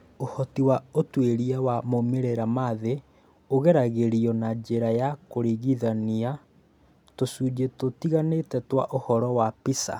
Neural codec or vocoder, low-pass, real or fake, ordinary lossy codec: none; 19.8 kHz; real; none